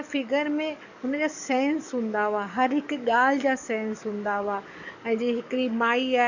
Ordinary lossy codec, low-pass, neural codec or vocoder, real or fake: none; 7.2 kHz; codec, 44.1 kHz, 7.8 kbps, DAC; fake